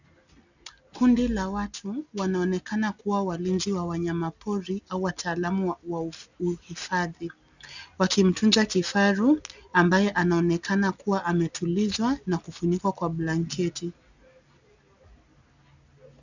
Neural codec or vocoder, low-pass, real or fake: none; 7.2 kHz; real